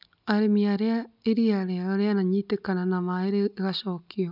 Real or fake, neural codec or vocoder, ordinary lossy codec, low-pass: real; none; none; 5.4 kHz